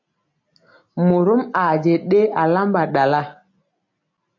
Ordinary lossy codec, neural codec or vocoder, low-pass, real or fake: MP3, 48 kbps; none; 7.2 kHz; real